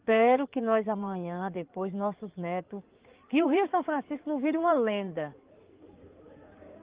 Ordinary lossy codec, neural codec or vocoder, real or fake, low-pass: Opus, 32 kbps; codec, 16 kHz in and 24 kHz out, 2.2 kbps, FireRedTTS-2 codec; fake; 3.6 kHz